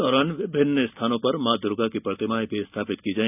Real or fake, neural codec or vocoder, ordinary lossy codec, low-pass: real; none; none; 3.6 kHz